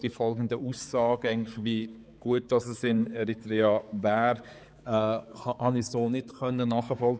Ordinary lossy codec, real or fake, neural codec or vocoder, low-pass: none; fake; codec, 16 kHz, 4 kbps, X-Codec, HuBERT features, trained on balanced general audio; none